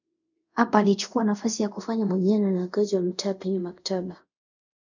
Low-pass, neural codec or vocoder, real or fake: 7.2 kHz; codec, 24 kHz, 0.5 kbps, DualCodec; fake